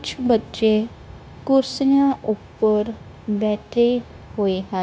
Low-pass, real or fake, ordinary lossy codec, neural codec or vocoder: none; fake; none; codec, 16 kHz, 0.9 kbps, LongCat-Audio-Codec